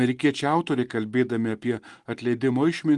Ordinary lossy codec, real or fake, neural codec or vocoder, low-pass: Opus, 24 kbps; real; none; 10.8 kHz